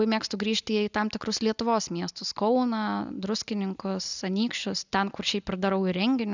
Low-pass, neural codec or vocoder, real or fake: 7.2 kHz; none; real